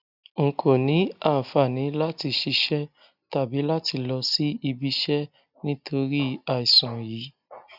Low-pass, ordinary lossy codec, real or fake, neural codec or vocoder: 5.4 kHz; none; real; none